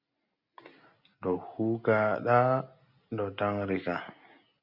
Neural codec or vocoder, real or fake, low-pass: none; real; 5.4 kHz